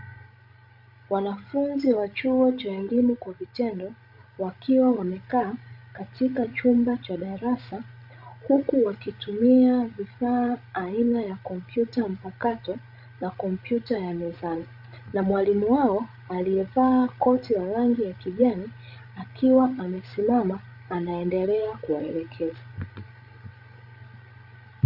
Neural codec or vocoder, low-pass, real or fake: codec, 16 kHz, 16 kbps, FreqCodec, larger model; 5.4 kHz; fake